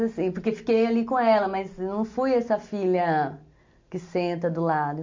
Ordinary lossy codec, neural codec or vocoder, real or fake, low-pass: MP3, 48 kbps; none; real; 7.2 kHz